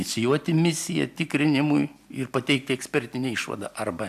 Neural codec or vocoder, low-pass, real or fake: none; 14.4 kHz; real